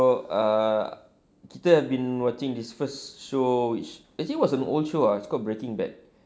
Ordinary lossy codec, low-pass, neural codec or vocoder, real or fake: none; none; none; real